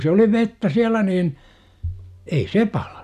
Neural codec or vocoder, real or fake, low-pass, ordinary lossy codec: none; real; 14.4 kHz; none